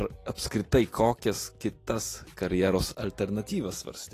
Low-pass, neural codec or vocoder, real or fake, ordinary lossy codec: 14.4 kHz; none; real; AAC, 48 kbps